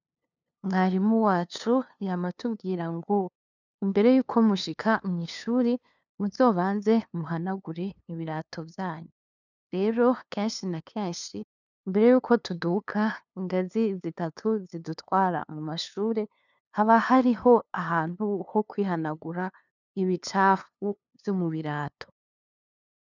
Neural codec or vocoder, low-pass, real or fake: codec, 16 kHz, 2 kbps, FunCodec, trained on LibriTTS, 25 frames a second; 7.2 kHz; fake